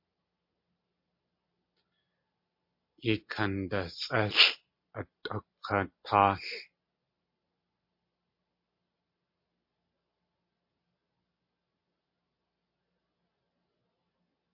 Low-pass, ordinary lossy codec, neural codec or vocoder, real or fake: 5.4 kHz; MP3, 32 kbps; none; real